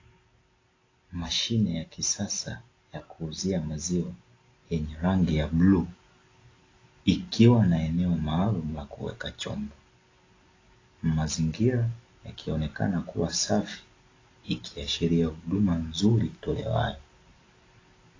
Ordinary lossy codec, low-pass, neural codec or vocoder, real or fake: AAC, 32 kbps; 7.2 kHz; none; real